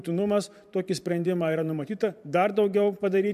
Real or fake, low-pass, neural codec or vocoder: fake; 14.4 kHz; vocoder, 44.1 kHz, 128 mel bands every 512 samples, BigVGAN v2